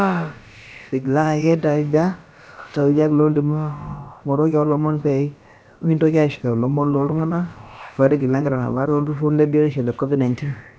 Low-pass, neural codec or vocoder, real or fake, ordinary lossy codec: none; codec, 16 kHz, about 1 kbps, DyCAST, with the encoder's durations; fake; none